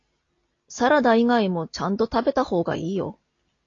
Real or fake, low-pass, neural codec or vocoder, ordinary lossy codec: real; 7.2 kHz; none; AAC, 32 kbps